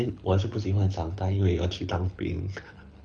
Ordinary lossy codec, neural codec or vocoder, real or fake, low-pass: none; codec, 24 kHz, 6 kbps, HILCodec; fake; 9.9 kHz